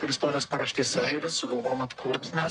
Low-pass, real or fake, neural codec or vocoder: 10.8 kHz; fake; codec, 44.1 kHz, 1.7 kbps, Pupu-Codec